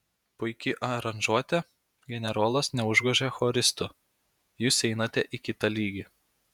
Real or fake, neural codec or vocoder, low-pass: fake; vocoder, 48 kHz, 128 mel bands, Vocos; 19.8 kHz